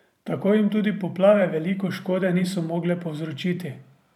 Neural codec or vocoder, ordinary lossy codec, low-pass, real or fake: vocoder, 44.1 kHz, 128 mel bands every 512 samples, BigVGAN v2; none; 19.8 kHz; fake